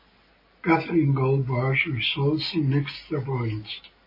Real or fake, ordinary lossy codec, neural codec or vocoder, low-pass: real; MP3, 24 kbps; none; 5.4 kHz